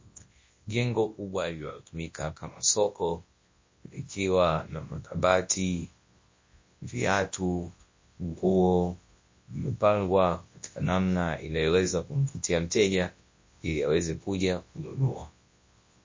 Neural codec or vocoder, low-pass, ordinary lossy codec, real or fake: codec, 24 kHz, 0.9 kbps, WavTokenizer, large speech release; 7.2 kHz; MP3, 32 kbps; fake